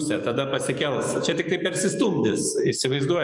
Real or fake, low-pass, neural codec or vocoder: fake; 10.8 kHz; codec, 44.1 kHz, 7.8 kbps, DAC